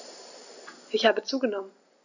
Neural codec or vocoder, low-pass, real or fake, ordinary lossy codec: none; 7.2 kHz; real; none